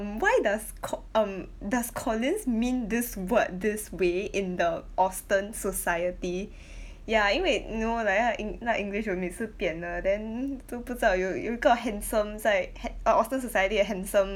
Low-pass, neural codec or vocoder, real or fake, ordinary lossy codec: 19.8 kHz; none; real; none